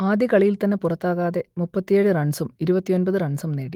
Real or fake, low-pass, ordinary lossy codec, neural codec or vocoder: fake; 19.8 kHz; Opus, 24 kbps; autoencoder, 48 kHz, 128 numbers a frame, DAC-VAE, trained on Japanese speech